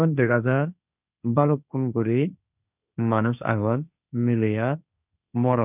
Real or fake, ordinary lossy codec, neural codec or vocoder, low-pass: fake; none; codec, 16 kHz, 1.1 kbps, Voila-Tokenizer; 3.6 kHz